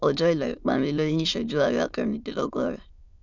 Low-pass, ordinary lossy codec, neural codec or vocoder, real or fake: 7.2 kHz; none; autoencoder, 22.05 kHz, a latent of 192 numbers a frame, VITS, trained on many speakers; fake